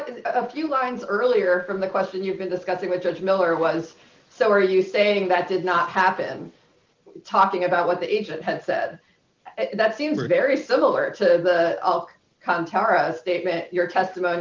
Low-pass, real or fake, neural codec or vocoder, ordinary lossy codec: 7.2 kHz; real; none; Opus, 24 kbps